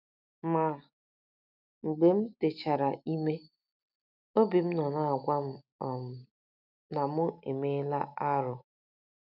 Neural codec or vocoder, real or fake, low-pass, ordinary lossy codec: none; real; 5.4 kHz; none